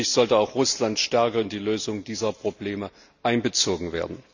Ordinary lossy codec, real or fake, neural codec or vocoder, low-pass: none; real; none; 7.2 kHz